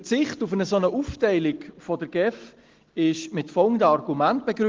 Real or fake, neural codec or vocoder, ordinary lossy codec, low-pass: real; none; Opus, 16 kbps; 7.2 kHz